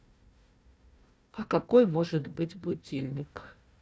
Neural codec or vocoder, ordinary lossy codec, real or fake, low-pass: codec, 16 kHz, 1 kbps, FunCodec, trained on Chinese and English, 50 frames a second; none; fake; none